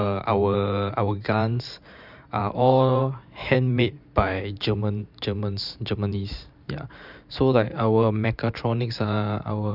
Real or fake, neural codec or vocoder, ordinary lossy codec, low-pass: fake; vocoder, 44.1 kHz, 128 mel bands every 512 samples, BigVGAN v2; none; 5.4 kHz